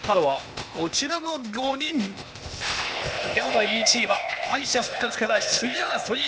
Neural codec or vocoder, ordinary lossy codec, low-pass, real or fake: codec, 16 kHz, 0.8 kbps, ZipCodec; none; none; fake